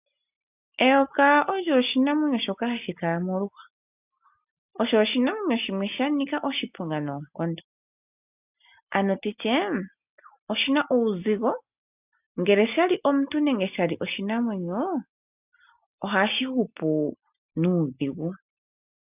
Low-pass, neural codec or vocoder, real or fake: 3.6 kHz; none; real